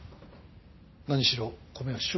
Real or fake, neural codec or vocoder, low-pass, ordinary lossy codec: real; none; 7.2 kHz; MP3, 24 kbps